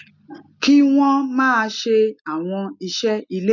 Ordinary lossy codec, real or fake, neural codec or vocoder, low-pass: none; real; none; 7.2 kHz